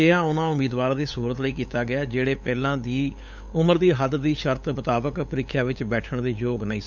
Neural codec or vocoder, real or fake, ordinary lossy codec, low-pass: codec, 16 kHz, 8 kbps, FunCodec, trained on LibriTTS, 25 frames a second; fake; none; 7.2 kHz